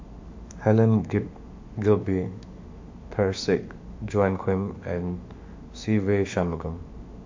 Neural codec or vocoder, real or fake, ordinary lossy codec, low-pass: autoencoder, 48 kHz, 32 numbers a frame, DAC-VAE, trained on Japanese speech; fake; MP3, 48 kbps; 7.2 kHz